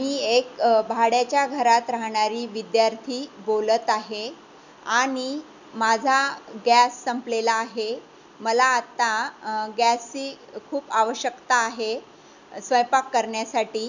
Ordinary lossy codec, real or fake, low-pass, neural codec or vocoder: none; real; 7.2 kHz; none